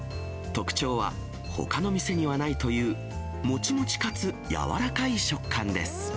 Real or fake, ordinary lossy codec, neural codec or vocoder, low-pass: real; none; none; none